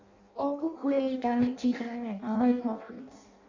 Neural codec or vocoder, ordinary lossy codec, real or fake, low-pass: codec, 16 kHz in and 24 kHz out, 0.6 kbps, FireRedTTS-2 codec; Opus, 64 kbps; fake; 7.2 kHz